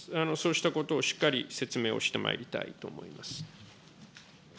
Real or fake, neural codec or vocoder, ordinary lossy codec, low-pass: real; none; none; none